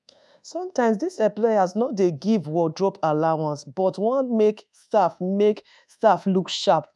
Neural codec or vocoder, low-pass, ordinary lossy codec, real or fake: codec, 24 kHz, 1.2 kbps, DualCodec; none; none; fake